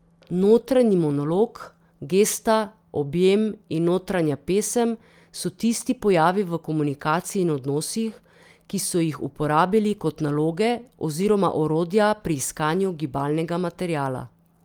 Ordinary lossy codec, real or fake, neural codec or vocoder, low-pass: Opus, 32 kbps; real; none; 19.8 kHz